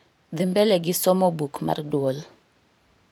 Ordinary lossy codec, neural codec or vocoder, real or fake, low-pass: none; vocoder, 44.1 kHz, 128 mel bands every 512 samples, BigVGAN v2; fake; none